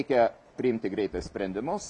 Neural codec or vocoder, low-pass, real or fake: none; 10.8 kHz; real